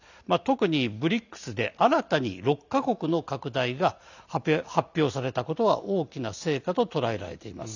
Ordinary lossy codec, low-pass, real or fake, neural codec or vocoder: none; 7.2 kHz; real; none